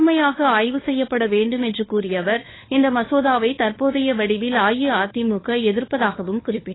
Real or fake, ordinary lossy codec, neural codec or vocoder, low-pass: fake; AAC, 16 kbps; codec, 16 kHz, 4 kbps, FunCodec, trained on LibriTTS, 50 frames a second; 7.2 kHz